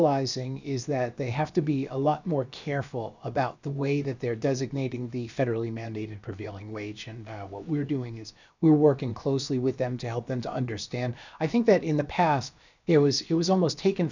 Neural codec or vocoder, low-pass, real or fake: codec, 16 kHz, about 1 kbps, DyCAST, with the encoder's durations; 7.2 kHz; fake